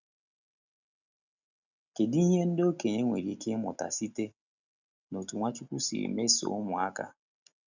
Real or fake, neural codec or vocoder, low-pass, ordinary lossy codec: real; none; 7.2 kHz; none